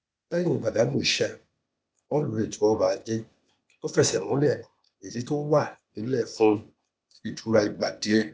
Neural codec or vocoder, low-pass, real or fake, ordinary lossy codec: codec, 16 kHz, 0.8 kbps, ZipCodec; none; fake; none